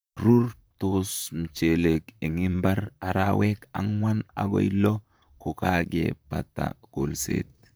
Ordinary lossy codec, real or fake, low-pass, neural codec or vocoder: none; fake; none; vocoder, 44.1 kHz, 128 mel bands, Pupu-Vocoder